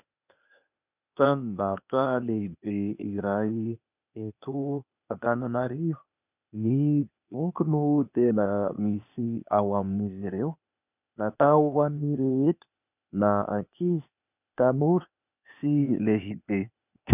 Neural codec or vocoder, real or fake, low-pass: codec, 16 kHz, 0.8 kbps, ZipCodec; fake; 3.6 kHz